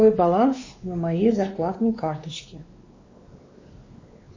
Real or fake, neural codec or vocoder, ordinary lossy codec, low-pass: fake; codec, 16 kHz, 2 kbps, X-Codec, WavLM features, trained on Multilingual LibriSpeech; MP3, 32 kbps; 7.2 kHz